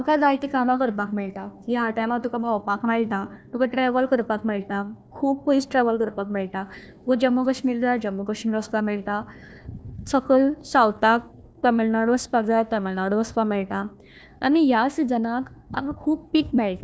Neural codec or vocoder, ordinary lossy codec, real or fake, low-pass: codec, 16 kHz, 1 kbps, FunCodec, trained on Chinese and English, 50 frames a second; none; fake; none